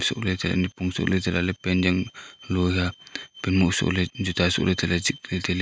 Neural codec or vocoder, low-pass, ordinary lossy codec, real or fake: none; none; none; real